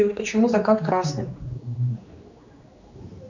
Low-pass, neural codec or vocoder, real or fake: 7.2 kHz; codec, 16 kHz, 2 kbps, X-Codec, HuBERT features, trained on general audio; fake